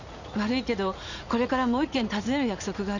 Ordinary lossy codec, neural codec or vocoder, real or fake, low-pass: none; vocoder, 44.1 kHz, 80 mel bands, Vocos; fake; 7.2 kHz